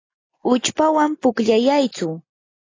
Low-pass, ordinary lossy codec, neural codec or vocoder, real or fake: 7.2 kHz; AAC, 32 kbps; none; real